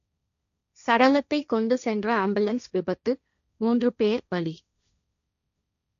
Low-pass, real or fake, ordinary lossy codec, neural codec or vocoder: 7.2 kHz; fake; none; codec, 16 kHz, 1.1 kbps, Voila-Tokenizer